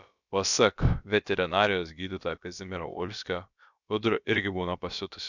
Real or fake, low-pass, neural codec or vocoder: fake; 7.2 kHz; codec, 16 kHz, about 1 kbps, DyCAST, with the encoder's durations